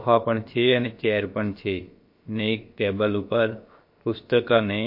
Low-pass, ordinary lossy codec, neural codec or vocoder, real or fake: 5.4 kHz; MP3, 32 kbps; codec, 16 kHz, about 1 kbps, DyCAST, with the encoder's durations; fake